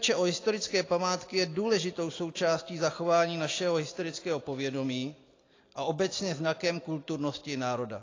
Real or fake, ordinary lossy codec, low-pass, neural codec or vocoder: real; AAC, 32 kbps; 7.2 kHz; none